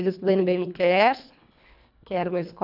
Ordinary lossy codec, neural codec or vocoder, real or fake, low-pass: none; codec, 24 kHz, 1.5 kbps, HILCodec; fake; 5.4 kHz